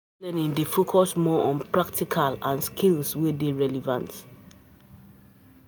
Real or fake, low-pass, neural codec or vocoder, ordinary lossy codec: real; none; none; none